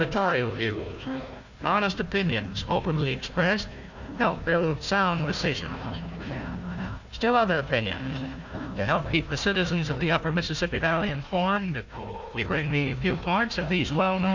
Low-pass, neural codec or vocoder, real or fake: 7.2 kHz; codec, 16 kHz, 1 kbps, FunCodec, trained on Chinese and English, 50 frames a second; fake